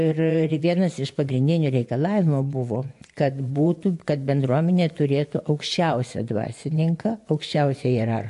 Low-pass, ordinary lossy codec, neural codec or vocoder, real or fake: 10.8 kHz; AAC, 64 kbps; vocoder, 24 kHz, 100 mel bands, Vocos; fake